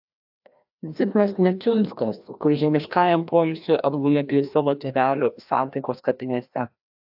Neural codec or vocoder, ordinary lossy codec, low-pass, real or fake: codec, 16 kHz, 1 kbps, FreqCodec, larger model; AAC, 48 kbps; 5.4 kHz; fake